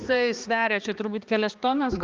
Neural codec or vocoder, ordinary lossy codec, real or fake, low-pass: codec, 16 kHz, 2 kbps, X-Codec, HuBERT features, trained on balanced general audio; Opus, 32 kbps; fake; 7.2 kHz